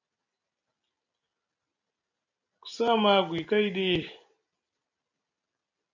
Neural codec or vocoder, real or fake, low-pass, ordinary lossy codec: none; real; 7.2 kHz; AAC, 48 kbps